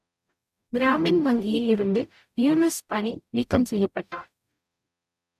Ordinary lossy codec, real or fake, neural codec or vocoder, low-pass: MP3, 96 kbps; fake; codec, 44.1 kHz, 0.9 kbps, DAC; 14.4 kHz